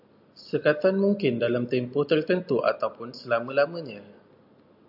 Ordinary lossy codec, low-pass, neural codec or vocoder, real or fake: AAC, 48 kbps; 5.4 kHz; none; real